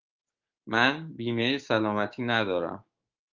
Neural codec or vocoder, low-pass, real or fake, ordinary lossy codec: codec, 16 kHz, 6 kbps, DAC; 7.2 kHz; fake; Opus, 32 kbps